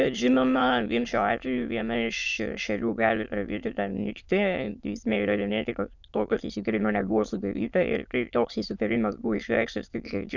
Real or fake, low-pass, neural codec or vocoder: fake; 7.2 kHz; autoencoder, 22.05 kHz, a latent of 192 numbers a frame, VITS, trained on many speakers